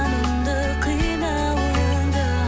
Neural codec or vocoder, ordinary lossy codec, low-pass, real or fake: none; none; none; real